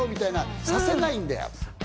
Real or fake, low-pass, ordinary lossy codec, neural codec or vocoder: real; none; none; none